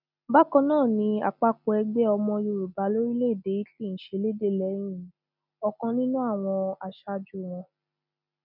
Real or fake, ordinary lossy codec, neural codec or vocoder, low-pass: real; none; none; 5.4 kHz